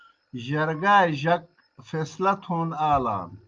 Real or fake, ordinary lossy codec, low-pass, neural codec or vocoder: real; Opus, 24 kbps; 7.2 kHz; none